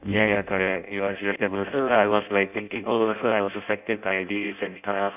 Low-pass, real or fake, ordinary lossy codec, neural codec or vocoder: 3.6 kHz; fake; none; codec, 16 kHz in and 24 kHz out, 0.6 kbps, FireRedTTS-2 codec